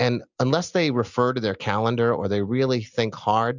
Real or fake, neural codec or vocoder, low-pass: real; none; 7.2 kHz